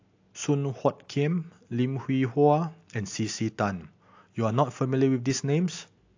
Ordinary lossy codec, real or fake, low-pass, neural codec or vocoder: AAC, 48 kbps; real; 7.2 kHz; none